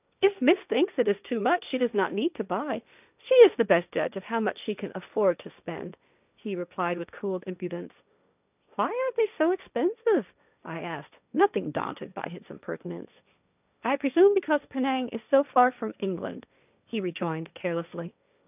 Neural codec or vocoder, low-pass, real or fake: codec, 16 kHz, 1.1 kbps, Voila-Tokenizer; 3.6 kHz; fake